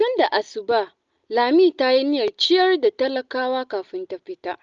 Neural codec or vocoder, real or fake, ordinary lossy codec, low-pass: none; real; Opus, 24 kbps; 7.2 kHz